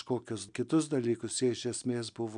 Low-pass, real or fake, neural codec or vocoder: 9.9 kHz; real; none